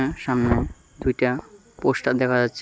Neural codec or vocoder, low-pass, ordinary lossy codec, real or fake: none; none; none; real